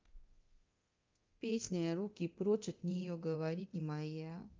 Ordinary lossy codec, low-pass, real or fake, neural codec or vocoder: Opus, 24 kbps; 7.2 kHz; fake; codec, 24 kHz, 0.9 kbps, DualCodec